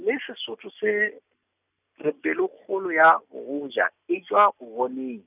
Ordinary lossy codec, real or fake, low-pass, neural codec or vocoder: none; real; 3.6 kHz; none